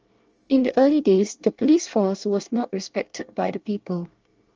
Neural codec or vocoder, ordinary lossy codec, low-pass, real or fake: codec, 24 kHz, 1 kbps, SNAC; Opus, 24 kbps; 7.2 kHz; fake